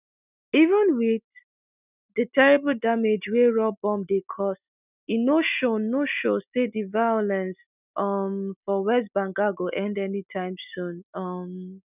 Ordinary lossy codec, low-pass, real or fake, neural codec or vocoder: none; 3.6 kHz; real; none